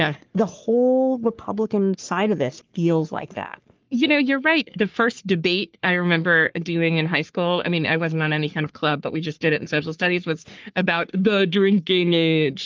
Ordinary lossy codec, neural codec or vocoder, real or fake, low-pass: Opus, 32 kbps; codec, 44.1 kHz, 3.4 kbps, Pupu-Codec; fake; 7.2 kHz